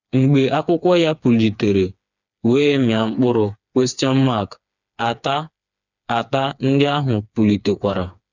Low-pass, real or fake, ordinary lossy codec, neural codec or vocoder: 7.2 kHz; fake; none; codec, 16 kHz, 4 kbps, FreqCodec, smaller model